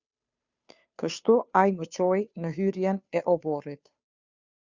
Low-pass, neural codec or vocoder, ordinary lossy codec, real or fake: 7.2 kHz; codec, 16 kHz, 2 kbps, FunCodec, trained on Chinese and English, 25 frames a second; Opus, 64 kbps; fake